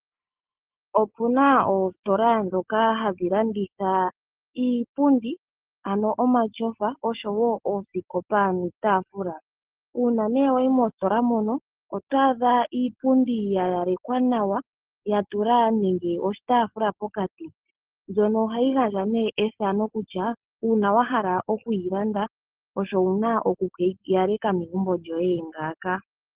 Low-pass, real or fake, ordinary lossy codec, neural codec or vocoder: 3.6 kHz; real; Opus, 16 kbps; none